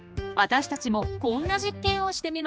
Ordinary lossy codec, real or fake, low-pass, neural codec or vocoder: none; fake; none; codec, 16 kHz, 2 kbps, X-Codec, HuBERT features, trained on general audio